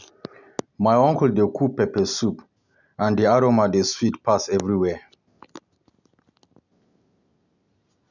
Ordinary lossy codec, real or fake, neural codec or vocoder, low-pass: none; real; none; 7.2 kHz